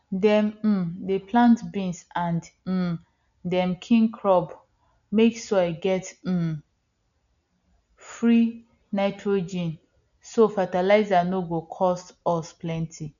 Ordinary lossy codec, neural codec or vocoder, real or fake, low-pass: none; none; real; 7.2 kHz